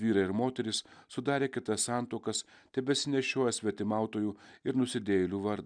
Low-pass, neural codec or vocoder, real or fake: 9.9 kHz; none; real